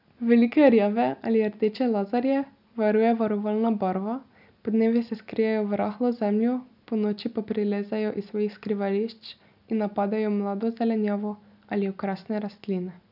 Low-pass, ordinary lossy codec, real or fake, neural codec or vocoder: 5.4 kHz; none; real; none